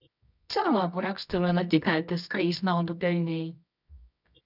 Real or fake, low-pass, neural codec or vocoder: fake; 5.4 kHz; codec, 24 kHz, 0.9 kbps, WavTokenizer, medium music audio release